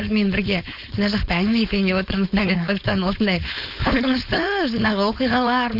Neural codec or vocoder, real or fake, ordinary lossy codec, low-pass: codec, 16 kHz, 4.8 kbps, FACodec; fake; none; 5.4 kHz